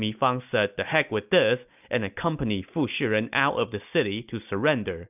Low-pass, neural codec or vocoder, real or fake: 3.6 kHz; none; real